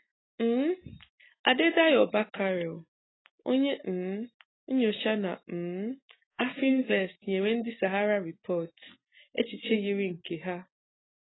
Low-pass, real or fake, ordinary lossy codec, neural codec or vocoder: 7.2 kHz; real; AAC, 16 kbps; none